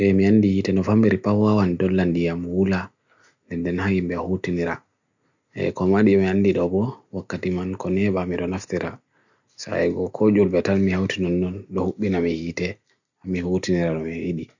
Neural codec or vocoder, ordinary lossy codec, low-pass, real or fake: none; none; 7.2 kHz; real